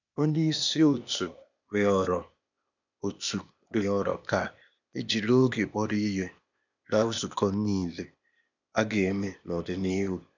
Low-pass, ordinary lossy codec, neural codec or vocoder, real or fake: 7.2 kHz; none; codec, 16 kHz, 0.8 kbps, ZipCodec; fake